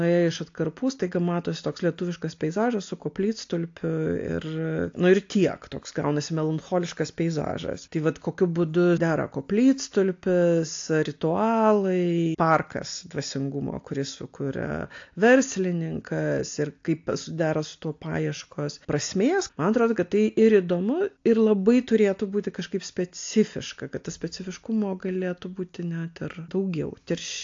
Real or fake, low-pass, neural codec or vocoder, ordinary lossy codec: real; 7.2 kHz; none; AAC, 48 kbps